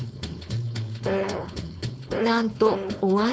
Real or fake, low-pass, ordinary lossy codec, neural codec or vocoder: fake; none; none; codec, 16 kHz, 4.8 kbps, FACodec